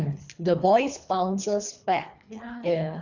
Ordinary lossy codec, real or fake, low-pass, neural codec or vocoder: none; fake; 7.2 kHz; codec, 24 kHz, 3 kbps, HILCodec